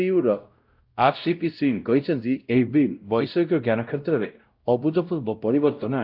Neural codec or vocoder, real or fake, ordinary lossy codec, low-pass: codec, 16 kHz, 0.5 kbps, X-Codec, WavLM features, trained on Multilingual LibriSpeech; fake; Opus, 32 kbps; 5.4 kHz